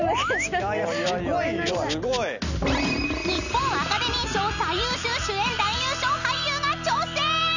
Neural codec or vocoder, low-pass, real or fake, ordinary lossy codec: none; 7.2 kHz; real; none